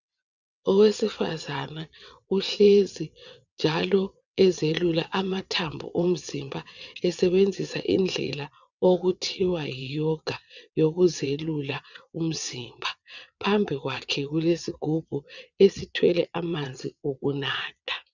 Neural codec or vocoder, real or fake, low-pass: vocoder, 22.05 kHz, 80 mel bands, WaveNeXt; fake; 7.2 kHz